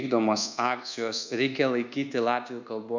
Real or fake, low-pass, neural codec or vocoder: fake; 7.2 kHz; codec, 24 kHz, 1.2 kbps, DualCodec